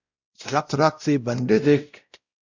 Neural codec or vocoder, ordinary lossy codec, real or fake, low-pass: codec, 16 kHz, 0.5 kbps, X-Codec, WavLM features, trained on Multilingual LibriSpeech; Opus, 64 kbps; fake; 7.2 kHz